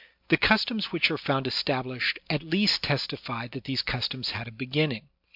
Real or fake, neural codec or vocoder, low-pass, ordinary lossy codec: real; none; 5.4 kHz; MP3, 48 kbps